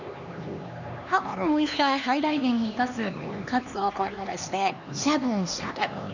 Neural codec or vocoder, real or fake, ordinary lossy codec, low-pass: codec, 16 kHz, 2 kbps, X-Codec, HuBERT features, trained on LibriSpeech; fake; none; 7.2 kHz